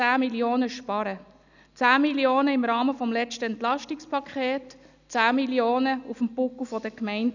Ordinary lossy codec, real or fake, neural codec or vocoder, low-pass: none; real; none; 7.2 kHz